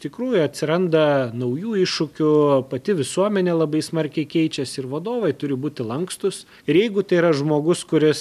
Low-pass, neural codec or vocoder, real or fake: 14.4 kHz; none; real